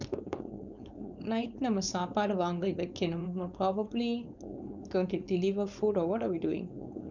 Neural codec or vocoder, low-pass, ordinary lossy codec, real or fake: codec, 16 kHz, 4.8 kbps, FACodec; 7.2 kHz; none; fake